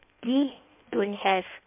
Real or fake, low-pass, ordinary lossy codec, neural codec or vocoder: fake; 3.6 kHz; MP3, 32 kbps; codec, 16 kHz in and 24 kHz out, 1.1 kbps, FireRedTTS-2 codec